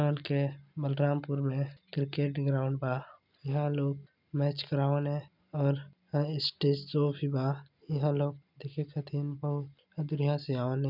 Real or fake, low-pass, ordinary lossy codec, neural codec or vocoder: real; 5.4 kHz; none; none